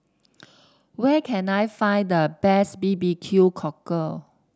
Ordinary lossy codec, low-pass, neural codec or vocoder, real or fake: none; none; none; real